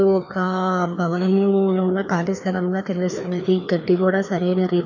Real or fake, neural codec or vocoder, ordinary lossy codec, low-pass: fake; codec, 16 kHz, 2 kbps, FreqCodec, larger model; none; 7.2 kHz